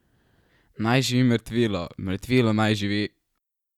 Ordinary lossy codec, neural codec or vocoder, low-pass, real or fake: none; none; 19.8 kHz; real